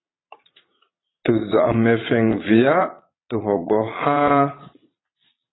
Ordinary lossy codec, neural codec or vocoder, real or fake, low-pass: AAC, 16 kbps; vocoder, 22.05 kHz, 80 mel bands, Vocos; fake; 7.2 kHz